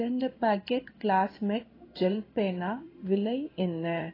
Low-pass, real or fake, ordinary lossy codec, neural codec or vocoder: 5.4 kHz; fake; AAC, 24 kbps; codec, 16 kHz in and 24 kHz out, 1 kbps, XY-Tokenizer